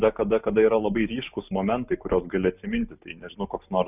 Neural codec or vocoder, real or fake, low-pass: none; real; 3.6 kHz